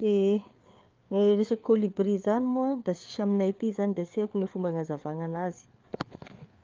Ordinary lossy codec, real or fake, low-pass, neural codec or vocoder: Opus, 24 kbps; fake; 7.2 kHz; codec, 16 kHz, 4 kbps, FreqCodec, larger model